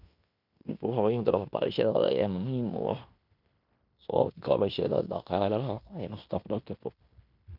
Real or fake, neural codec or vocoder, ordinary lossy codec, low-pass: fake; codec, 16 kHz in and 24 kHz out, 0.9 kbps, LongCat-Audio-Codec, fine tuned four codebook decoder; none; 5.4 kHz